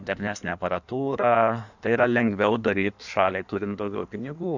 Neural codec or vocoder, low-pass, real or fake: codec, 16 kHz in and 24 kHz out, 1.1 kbps, FireRedTTS-2 codec; 7.2 kHz; fake